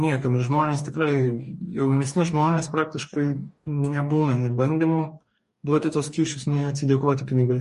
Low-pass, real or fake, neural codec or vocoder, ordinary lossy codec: 14.4 kHz; fake; codec, 44.1 kHz, 2.6 kbps, DAC; MP3, 48 kbps